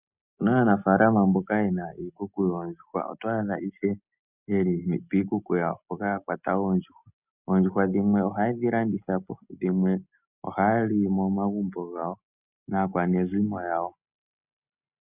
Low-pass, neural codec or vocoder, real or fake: 3.6 kHz; none; real